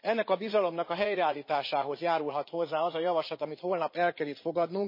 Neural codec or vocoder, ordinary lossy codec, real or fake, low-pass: vocoder, 44.1 kHz, 80 mel bands, Vocos; MP3, 24 kbps; fake; 5.4 kHz